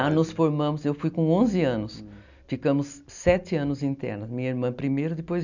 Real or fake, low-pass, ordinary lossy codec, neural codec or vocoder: real; 7.2 kHz; none; none